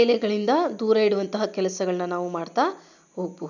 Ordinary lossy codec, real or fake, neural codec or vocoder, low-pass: none; real; none; 7.2 kHz